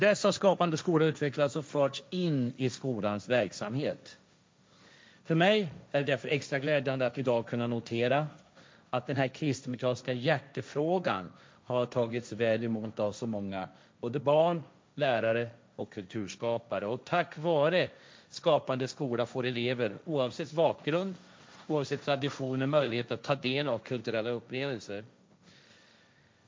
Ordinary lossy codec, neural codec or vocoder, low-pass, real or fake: none; codec, 16 kHz, 1.1 kbps, Voila-Tokenizer; none; fake